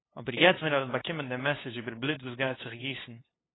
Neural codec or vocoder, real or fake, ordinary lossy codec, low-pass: codec, 16 kHz, 2 kbps, FunCodec, trained on LibriTTS, 25 frames a second; fake; AAC, 16 kbps; 7.2 kHz